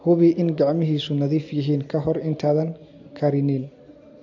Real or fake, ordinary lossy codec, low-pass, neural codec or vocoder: real; AAC, 48 kbps; 7.2 kHz; none